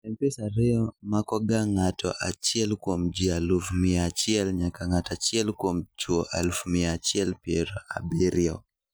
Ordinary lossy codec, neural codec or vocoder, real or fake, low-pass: none; none; real; none